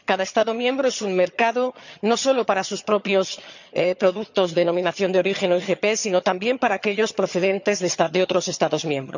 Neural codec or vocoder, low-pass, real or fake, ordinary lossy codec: vocoder, 22.05 kHz, 80 mel bands, HiFi-GAN; 7.2 kHz; fake; none